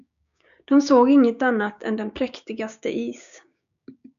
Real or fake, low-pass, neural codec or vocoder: fake; 7.2 kHz; codec, 16 kHz, 6 kbps, DAC